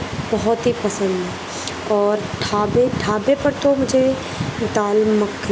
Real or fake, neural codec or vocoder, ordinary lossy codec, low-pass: real; none; none; none